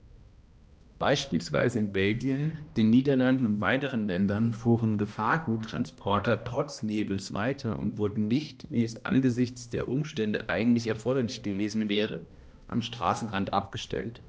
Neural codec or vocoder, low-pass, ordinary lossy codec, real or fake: codec, 16 kHz, 1 kbps, X-Codec, HuBERT features, trained on balanced general audio; none; none; fake